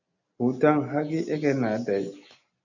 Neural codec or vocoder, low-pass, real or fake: none; 7.2 kHz; real